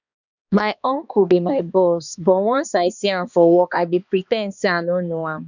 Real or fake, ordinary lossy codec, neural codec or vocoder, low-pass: fake; none; codec, 16 kHz, 2 kbps, X-Codec, HuBERT features, trained on balanced general audio; 7.2 kHz